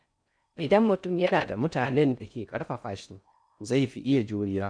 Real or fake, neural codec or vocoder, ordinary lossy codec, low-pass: fake; codec, 16 kHz in and 24 kHz out, 0.6 kbps, FocalCodec, streaming, 4096 codes; none; 9.9 kHz